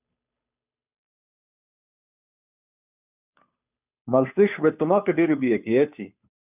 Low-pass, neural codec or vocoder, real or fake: 3.6 kHz; codec, 16 kHz, 2 kbps, FunCodec, trained on Chinese and English, 25 frames a second; fake